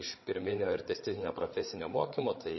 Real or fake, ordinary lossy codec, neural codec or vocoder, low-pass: fake; MP3, 24 kbps; codec, 16 kHz, 8 kbps, FreqCodec, larger model; 7.2 kHz